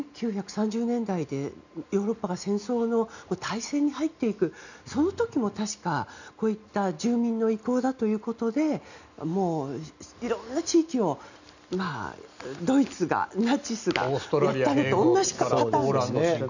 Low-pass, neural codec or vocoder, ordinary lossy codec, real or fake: 7.2 kHz; none; none; real